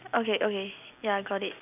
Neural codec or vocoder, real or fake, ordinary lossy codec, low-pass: none; real; none; 3.6 kHz